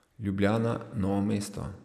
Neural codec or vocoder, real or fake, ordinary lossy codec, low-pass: none; real; none; 14.4 kHz